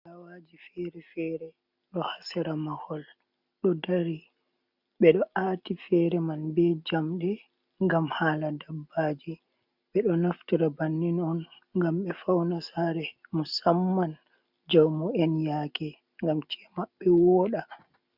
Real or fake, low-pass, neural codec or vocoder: real; 5.4 kHz; none